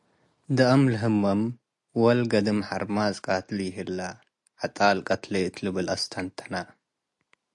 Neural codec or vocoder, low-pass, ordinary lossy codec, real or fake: none; 10.8 kHz; AAC, 64 kbps; real